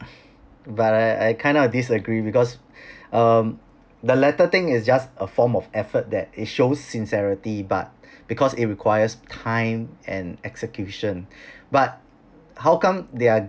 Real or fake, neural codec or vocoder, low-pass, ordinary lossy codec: real; none; none; none